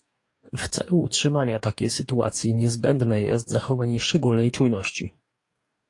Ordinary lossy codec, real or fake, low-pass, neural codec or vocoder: AAC, 48 kbps; fake; 10.8 kHz; codec, 44.1 kHz, 2.6 kbps, DAC